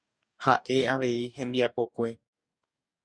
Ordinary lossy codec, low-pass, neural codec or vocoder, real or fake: Opus, 64 kbps; 9.9 kHz; codec, 44.1 kHz, 2.6 kbps, DAC; fake